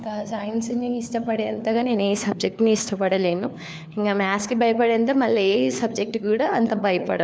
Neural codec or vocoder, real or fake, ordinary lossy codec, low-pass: codec, 16 kHz, 4 kbps, FunCodec, trained on LibriTTS, 50 frames a second; fake; none; none